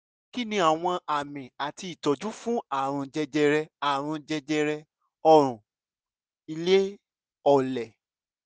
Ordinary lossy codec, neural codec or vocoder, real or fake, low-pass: none; none; real; none